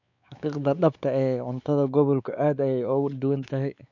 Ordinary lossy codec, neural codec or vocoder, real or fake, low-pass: none; codec, 16 kHz, 4 kbps, X-Codec, WavLM features, trained on Multilingual LibriSpeech; fake; 7.2 kHz